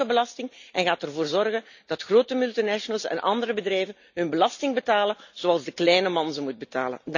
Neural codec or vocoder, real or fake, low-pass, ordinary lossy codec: none; real; 7.2 kHz; none